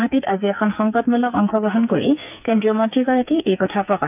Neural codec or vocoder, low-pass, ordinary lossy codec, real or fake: codec, 44.1 kHz, 2.6 kbps, SNAC; 3.6 kHz; none; fake